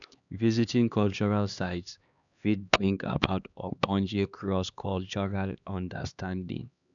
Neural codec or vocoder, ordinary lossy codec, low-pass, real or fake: codec, 16 kHz, 2 kbps, X-Codec, HuBERT features, trained on LibriSpeech; none; 7.2 kHz; fake